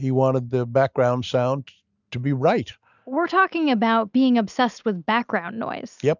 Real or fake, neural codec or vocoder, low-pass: real; none; 7.2 kHz